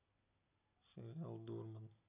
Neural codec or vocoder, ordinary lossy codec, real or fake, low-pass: none; none; real; 3.6 kHz